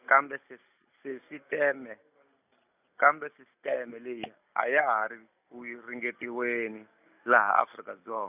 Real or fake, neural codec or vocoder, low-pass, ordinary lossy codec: real; none; 3.6 kHz; none